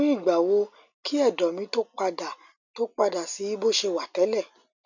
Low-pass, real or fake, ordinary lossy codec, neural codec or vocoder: 7.2 kHz; real; none; none